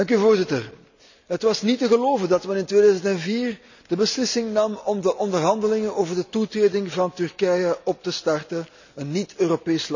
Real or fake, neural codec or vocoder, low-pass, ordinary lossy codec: real; none; 7.2 kHz; none